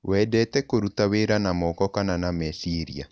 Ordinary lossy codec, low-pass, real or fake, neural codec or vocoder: none; none; real; none